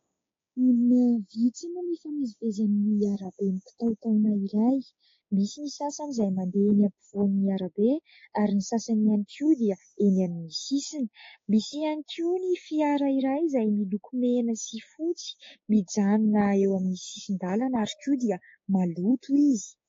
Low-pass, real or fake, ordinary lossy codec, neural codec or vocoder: 7.2 kHz; fake; AAC, 32 kbps; codec, 16 kHz, 6 kbps, DAC